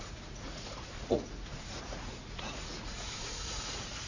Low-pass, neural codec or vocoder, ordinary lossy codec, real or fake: 7.2 kHz; vocoder, 44.1 kHz, 80 mel bands, Vocos; Opus, 64 kbps; fake